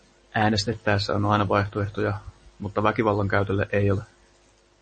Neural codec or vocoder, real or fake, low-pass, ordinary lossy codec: none; real; 10.8 kHz; MP3, 32 kbps